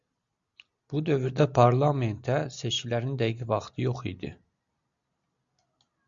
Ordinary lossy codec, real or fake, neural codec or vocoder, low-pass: Opus, 64 kbps; real; none; 7.2 kHz